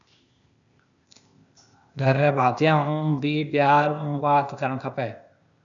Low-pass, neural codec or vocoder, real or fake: 7.2 kHz; codec, 16 kHz, 0.8 kbps, ZipCodec; fake